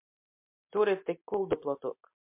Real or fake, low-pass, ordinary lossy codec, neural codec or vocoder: real; 3.6 kHz; MP3, 32 kbps; none